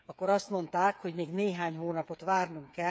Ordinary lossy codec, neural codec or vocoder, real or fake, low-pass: none; codec, 16 kHz, 8 kbps, FreqCodec, smaller model; fake; none